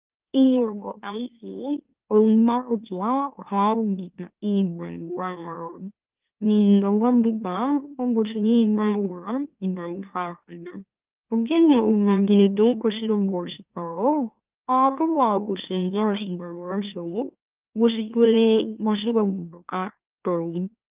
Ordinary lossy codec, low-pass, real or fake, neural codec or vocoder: Opus, 24 kbps; 3.6 kHz; fake; autoencoder, 44.1 kHz, a latent of 192 numbers a frame, MeloTTS